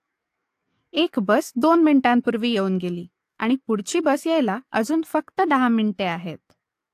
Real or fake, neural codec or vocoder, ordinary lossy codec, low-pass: fake; codec, 44.1 kHz, 7.8 kbps, DAC; AAC, 64 kbps; 14.4 kHz